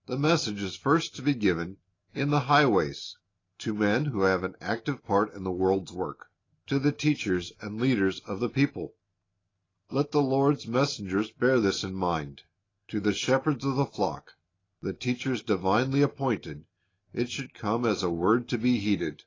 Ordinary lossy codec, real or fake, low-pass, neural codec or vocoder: AAC, 32 kbps; real; 7.2 kHz; none